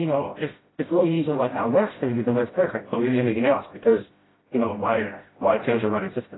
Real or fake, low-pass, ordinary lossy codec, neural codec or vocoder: fake; 7.2 kHz; AAC, 16 kbps; codec, 16 kHz, 0.5 kbps, FreqCodec, smaller model